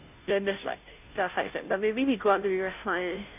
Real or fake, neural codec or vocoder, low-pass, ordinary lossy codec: fake; codec, 16 kHz, 0.5 kbps, FunCodec, trained on Chinese and English, 25 frames a second; 3.6 kHz; AAC, 32 kbps